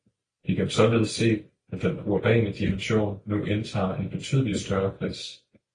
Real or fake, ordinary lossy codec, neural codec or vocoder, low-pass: real; AAC, 32 kbps; none; 9.9 kHz